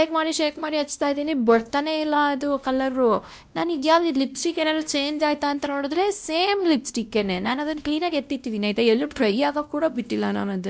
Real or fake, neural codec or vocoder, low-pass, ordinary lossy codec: fake; codec, 16 kHz, 1 kbps, X-Codec, WavLM features, trained on Multilingual LibriSpeech; none; none